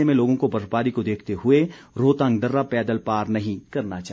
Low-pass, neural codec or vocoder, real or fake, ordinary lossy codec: none; none; real; none